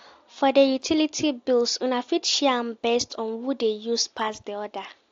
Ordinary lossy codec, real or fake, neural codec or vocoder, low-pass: AAC, 48 kbps; real; none; 7.2 kHz